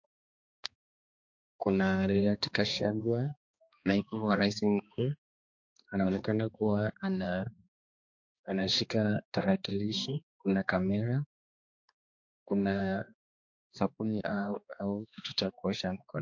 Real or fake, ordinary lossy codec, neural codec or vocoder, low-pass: fake; MP3, 48 kbps; codec, 16 kHz, 2 kbps, X-Codec, HuBERT features, trained on balanced general audio; 7.2 kHz